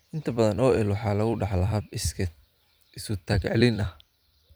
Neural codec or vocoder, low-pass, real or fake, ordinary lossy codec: vocoder, 44.1 kHz, 128 mel bands every 256 samples, BigVGAN v2; none; fake; none